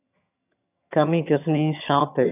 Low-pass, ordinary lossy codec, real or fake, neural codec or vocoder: 3.6 kHz; AAC, 32 kbps; fake; vocoder, 22.05 kHz, 80 mel bands, HiFi-GAN